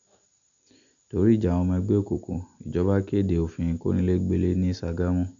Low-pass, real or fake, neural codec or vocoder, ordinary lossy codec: 7.2 kHz; real; none; none